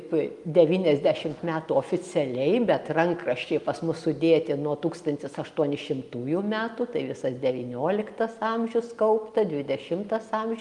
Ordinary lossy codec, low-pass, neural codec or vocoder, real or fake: Opus, 32 kbps; 10.8 kHz; none; real